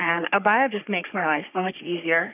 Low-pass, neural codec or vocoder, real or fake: 3.6 kHz; codec, 44.1 kHz, 3.4 kbps, Pupu-Codec; fake